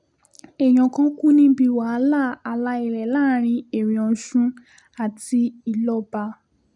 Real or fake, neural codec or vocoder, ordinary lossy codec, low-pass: real; none; none; 10.8 kHz